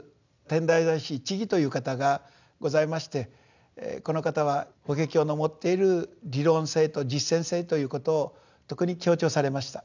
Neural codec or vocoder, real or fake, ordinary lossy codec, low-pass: none; real; none; 7.2 kHz